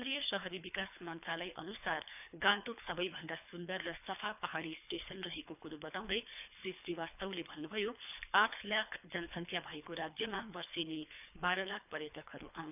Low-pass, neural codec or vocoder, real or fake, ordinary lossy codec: 3.6 kHz; codec, 24 kHz, 3 kbps, HILCodec; fake; none